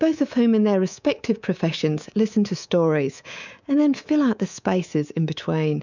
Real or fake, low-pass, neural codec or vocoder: fake; 7.2 kHz; codec, 24 kHz, 3.1 kbps, DualCodec